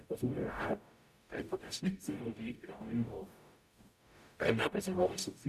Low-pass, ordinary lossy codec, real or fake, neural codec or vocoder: 14.4 kHz; none; fake; codec, 44.1 kHz, 0.9 kbps, DAC